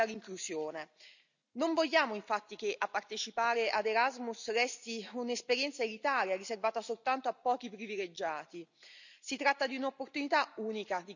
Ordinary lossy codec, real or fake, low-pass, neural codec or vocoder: none; real; 7.2 kHz; none